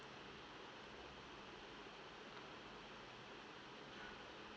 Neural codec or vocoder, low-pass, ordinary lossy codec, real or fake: none; none; none; real